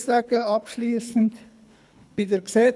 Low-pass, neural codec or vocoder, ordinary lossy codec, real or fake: none; codec, 24 kHz, 3 kbps, HILCodec; none; fake